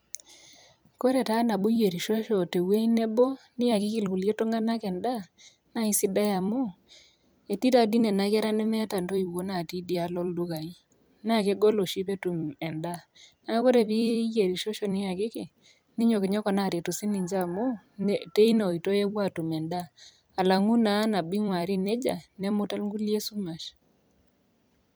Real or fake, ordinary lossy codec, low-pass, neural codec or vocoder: fake; none; none; vocoder, 44.1 kHz, 128 mel bands every 256 samples, BigVGAN v2